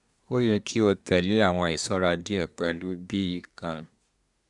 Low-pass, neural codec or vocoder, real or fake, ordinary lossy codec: 10.8 kHz; codec, 24 kHz, 1 kbps, SNAC; fake; none